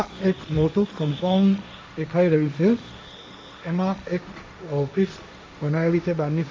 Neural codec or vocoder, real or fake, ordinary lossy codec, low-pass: codec, 16 kHz, 1.1 kbps, Voila-Tokenizer; fake; none; none